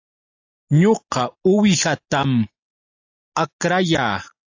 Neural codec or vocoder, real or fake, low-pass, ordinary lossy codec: none; real; 7.2 kHz; AAC, 48 kbps